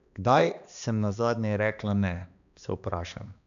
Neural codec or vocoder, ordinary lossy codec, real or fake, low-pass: codec, 16 kHz, 2 kbps, X-Codec, HuBERT features, trained on balanced general audio; none; fake; 7.2 kHz